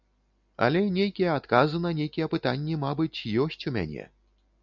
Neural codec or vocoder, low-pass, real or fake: none; 7.2 kHz; real